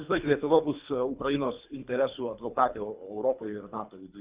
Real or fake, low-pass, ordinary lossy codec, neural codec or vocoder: fake; 3.6 kHz; Opus, 64 kbps; codec, 24 kHz, 3 kbps, HILCodec